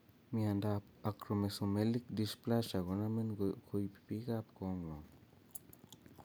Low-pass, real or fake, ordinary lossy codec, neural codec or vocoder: none; real; none; none